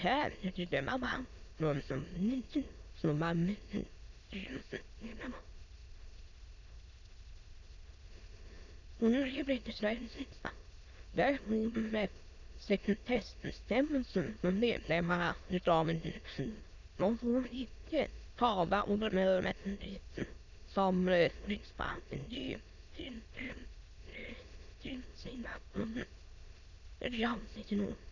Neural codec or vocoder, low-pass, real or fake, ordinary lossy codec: autoencoder, 22.05 kHz, a latent of 192 numbers a frame, VITS, trained on many speakers; 7.2 kHz; fake; none